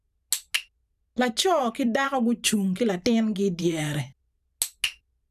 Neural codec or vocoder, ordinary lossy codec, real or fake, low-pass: vocoder, 44.1 kHz, 128 mel bands every 512 samples, BigVGAN v2; none; fake; 14.4 kHz